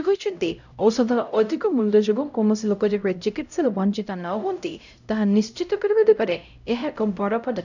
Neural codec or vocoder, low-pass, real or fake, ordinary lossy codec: codec, 16 kHz, 0.5 kbps, X-Codec, HuBERT features, trained on LibriSpeech; 7.2 kHz; fake; none